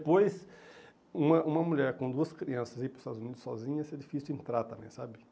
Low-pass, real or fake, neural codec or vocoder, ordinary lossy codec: none; real; none; none